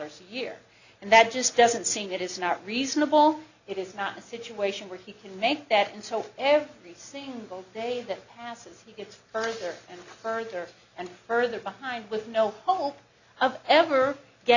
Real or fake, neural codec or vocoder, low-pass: real; none; 7.2 kHz